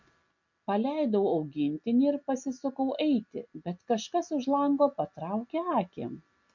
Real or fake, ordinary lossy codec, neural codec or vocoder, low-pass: real; MP3, 64 kbps; none; 7.2 kHz